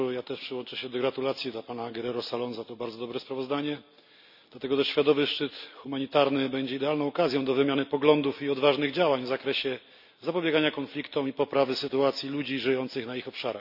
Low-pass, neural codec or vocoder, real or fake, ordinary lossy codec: 5.4 kHz; none; real; none